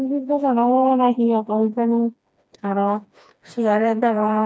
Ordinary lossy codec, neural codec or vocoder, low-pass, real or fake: none; codec, 16 kHz, 2 kbps, FreqCodec, smaller model; none; fake